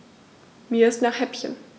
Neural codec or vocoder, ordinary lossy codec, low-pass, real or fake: none; none; none; real